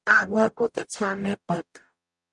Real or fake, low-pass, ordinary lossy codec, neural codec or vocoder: fake; 10.8 kHz; MP3, 96 kbps; codec, 44.1 kHz, 0.9 kbps, DAC